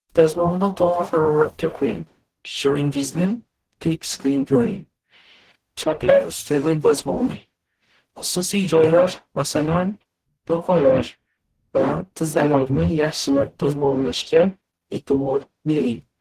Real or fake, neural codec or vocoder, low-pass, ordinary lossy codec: fake; codec, 44.1 kHz, 0.9 kbps, DAC; 14.4 kHz; Opus, 16 kbps